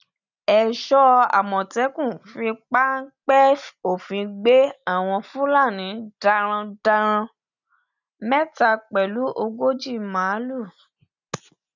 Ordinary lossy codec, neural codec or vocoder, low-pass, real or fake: none; none; 7.2 kHz; real